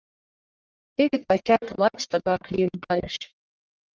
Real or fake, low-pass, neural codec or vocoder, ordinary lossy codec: fake; 7.2 kHz; codec, 44.1 kHz, 1.7 kbps, Pupu-Codec; Opus, 24 kbps